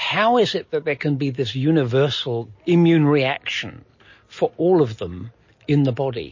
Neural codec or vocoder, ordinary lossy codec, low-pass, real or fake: none; MP3, 32 kbps; 7.2 kHz; real